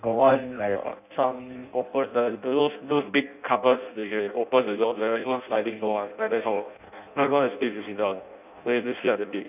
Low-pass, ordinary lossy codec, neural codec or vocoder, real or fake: 3.6 kHz; none; codec, 16 kHz in and 24 kHz out, 0.6 kbps, FireRedTTS-2 codec; fake